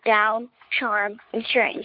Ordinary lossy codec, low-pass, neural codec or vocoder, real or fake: MP3, 48 kbps; 5.4 kHz; codec, 16 kHz, 4 kbps, FunCodec, trained on LibriTTS, 50 frames a second; fake